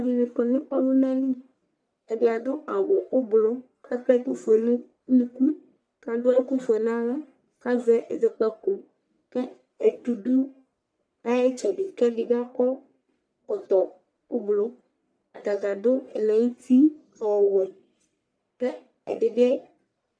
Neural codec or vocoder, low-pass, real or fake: codec, 44.1 kHz, 1.7 kbps, Pupu-Codec; 9.9 kHz; fake